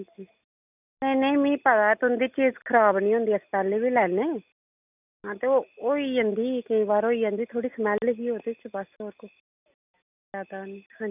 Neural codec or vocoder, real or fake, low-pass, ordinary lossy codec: none; real; 3.6 kHz; none